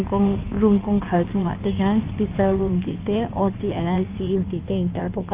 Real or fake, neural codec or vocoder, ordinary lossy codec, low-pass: fake; codec, 16 kHz in and 24 kHz out, 1.1 kbps, FireRedTTS-2 codec; Opus, 24 kbps; 3.6 kHz